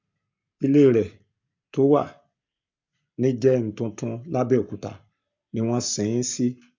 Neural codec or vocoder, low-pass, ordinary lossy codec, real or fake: codec, 44.1 kHz, 7.8 kbps, Pupu-Codec; 7.2 kHz; MP3, 64 kbps; fake